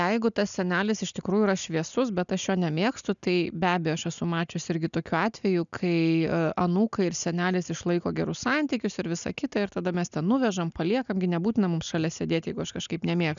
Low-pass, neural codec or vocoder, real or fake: 7.2 kHz; none; real